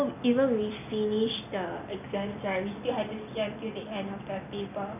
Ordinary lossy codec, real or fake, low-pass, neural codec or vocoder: none; real; 3.6 kHz; none